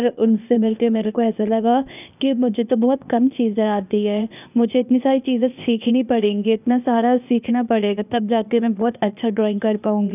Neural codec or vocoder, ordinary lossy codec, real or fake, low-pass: codec, 16 kHz, 0.8 kbps, ZipCodec; none; fake; 3.6 kHz